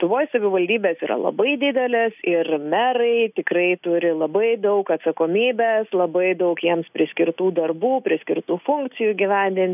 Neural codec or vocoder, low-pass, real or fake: none; 3.6 kHz; real